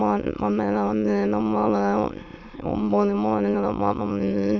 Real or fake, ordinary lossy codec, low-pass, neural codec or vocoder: fake; none; 7.2 kHz; autoencoder, 22.05 kHz, a latent of 192 numbers a frame, VITS, trained on many speakers